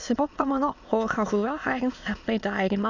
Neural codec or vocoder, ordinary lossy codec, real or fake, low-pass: autoencoder, 22.05 kHz, a latent of 192 numbers a frame, VITS, trained on many speakers; none; fake; 7.2 kHz